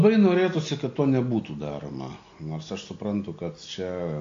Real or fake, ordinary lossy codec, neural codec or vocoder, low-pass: real; AAC, 48 kbps; none; 7.2 kHz